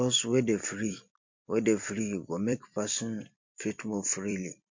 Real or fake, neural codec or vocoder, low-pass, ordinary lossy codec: fake; vocoder, 44.1 kHz, 128 mel bands every 256 samples, BigVGAN v2; 7.2 kHz; MP3, 48 kbps